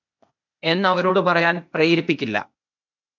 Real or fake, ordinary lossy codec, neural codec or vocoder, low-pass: fake; MP3, 64 kbps; codec, 16 kHz, 0.8 kbps, ZipCodec; 7.2 kHz